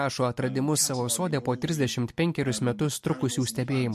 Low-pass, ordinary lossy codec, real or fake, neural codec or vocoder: 14.4 kHz; MP3, 64 kbps; real; none